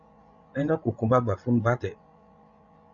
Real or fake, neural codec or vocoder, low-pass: fake; codec, 16 kHz, 16 kbps, FreqCodec, larger model; 7.2 kHz